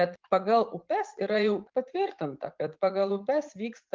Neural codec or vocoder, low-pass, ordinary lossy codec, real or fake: vocoder, 44.1 kHz, 80 mel bands, Vocos; 7.2 kHz; Opus, 32 kbps; fake